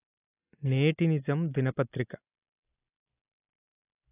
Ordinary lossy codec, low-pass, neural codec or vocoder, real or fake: AAC, 16 kbps; 3.6 kHz; none; real